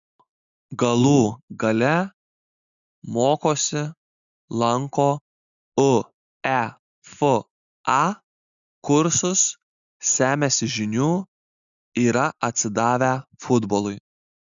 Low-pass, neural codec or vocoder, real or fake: 7.2 kHz; none; real